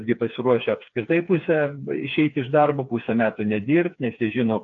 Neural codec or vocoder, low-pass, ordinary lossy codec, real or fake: codec, 16 kHz, 4 kbps, FreqCodec, smaller model; 7.2 kHz; AAC, 48 kbps; fake